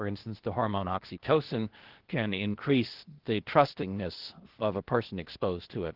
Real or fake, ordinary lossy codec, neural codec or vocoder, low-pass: fake; Opus, 16 kbps; codec, 16 kHz, 0.8 kbps, ZipCodec; 5.4 kHz